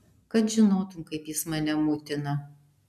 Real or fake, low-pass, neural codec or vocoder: real; 14.4 kHz; none